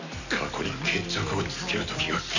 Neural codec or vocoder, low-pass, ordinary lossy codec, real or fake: none; 7.2 kHz; none; real